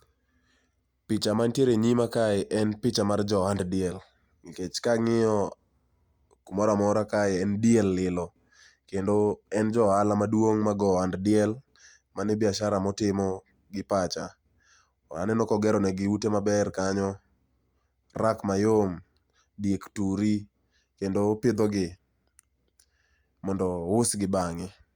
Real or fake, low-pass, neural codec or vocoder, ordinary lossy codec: real; 19.8 kHz; none; Opus, 64 kbps